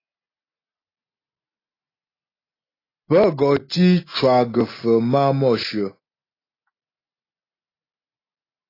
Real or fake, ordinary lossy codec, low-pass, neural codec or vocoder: real; AAC, 24 kbps; 5.4 kHz; none